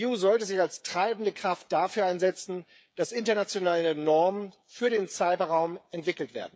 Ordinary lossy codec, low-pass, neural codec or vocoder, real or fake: none; none; codec, 16 kHz, 8 kbps, FreqCodec, smaller model; fake